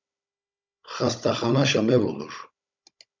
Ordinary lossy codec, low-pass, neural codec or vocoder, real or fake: MP3, 64 kbps; 7.2 kHz; codec, 16 kHz, 16 kbps, FunCodec, trained on Chinese and English, 50 frames a second; fake